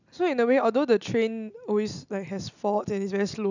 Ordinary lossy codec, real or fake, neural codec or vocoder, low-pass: none; real; none; 7.2 kHz